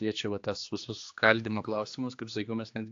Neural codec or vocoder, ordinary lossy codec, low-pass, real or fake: codec, 16 kHz, 2 kbps, X-Codec, HuBERT features, trained on general audio; AAC, 48 kbps; 7.2 kHz; fake